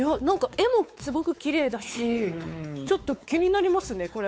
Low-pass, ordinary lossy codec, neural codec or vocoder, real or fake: none; none; codec, 16 kHz, 4 kbps, X-Codec, WavLM features, trained on Multilingual LibriSpeech; fake